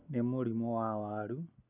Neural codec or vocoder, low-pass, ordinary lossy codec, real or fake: none; 3.6 kHz; none; real